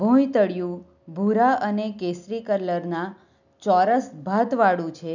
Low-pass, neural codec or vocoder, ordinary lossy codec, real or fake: 7.2 kHz; none; none; real